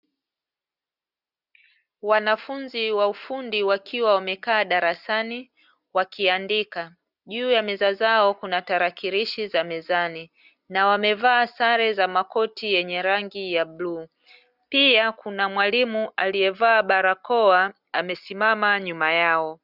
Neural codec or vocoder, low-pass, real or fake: none; 5.4 kHz; real